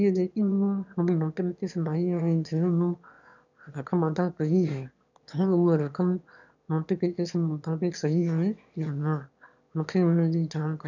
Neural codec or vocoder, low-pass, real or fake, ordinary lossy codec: autoencoder, 22.05 kHz, a latent of 192 numbers a frame, VITS, trained on one speaker; 7.2 kHz; fake; none